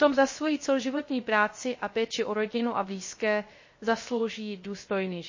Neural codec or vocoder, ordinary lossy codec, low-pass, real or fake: codec, 16 kHz, 0.3 kbps, FocalCodec; MP3, 32 kbps; 7.2 kHz; fake